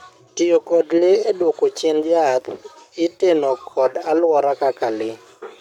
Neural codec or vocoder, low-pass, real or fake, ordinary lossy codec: codec, 44.1 kHz, 7.8 kbps, Pupu-Codec; 19.8 kHz; fake; none